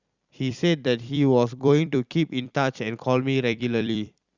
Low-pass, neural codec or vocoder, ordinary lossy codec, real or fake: 7.2 kHz; vocoder, 44.1 kHz, 128 mel bands every 256 samples, BigVGAN v2; Opus, 64 kbps; fake